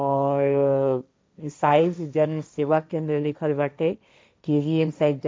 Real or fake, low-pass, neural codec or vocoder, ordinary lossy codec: fake; none; codec, 16 kHz, 1.1 kbps, Voila-Tokenizer; none